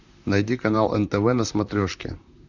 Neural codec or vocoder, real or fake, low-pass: vocoder, 44.1 kHz, 128 mel bands, Pupu-Vocoder; fake; 7.2 kHz